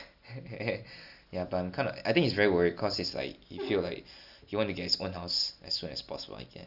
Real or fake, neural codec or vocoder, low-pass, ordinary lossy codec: real; none; 5.4 kHz; none